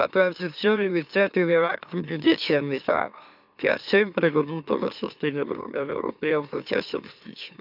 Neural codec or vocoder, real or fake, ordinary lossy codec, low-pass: autoencoder, 44.1 kHz, a latent of 192 numbers a frame, MeloTTS; fake; none; 5.4 kHz